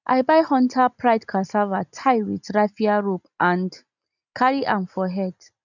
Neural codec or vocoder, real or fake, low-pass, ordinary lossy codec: none; real; 7.2 kHz; none